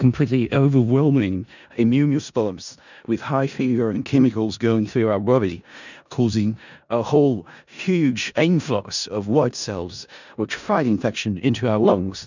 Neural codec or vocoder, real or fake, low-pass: codec, 16 kHz in and 24 kHz out, 0.4 kbps, LongCat-Audio-Codec, four codebook decoder; fake; 7.2 kHz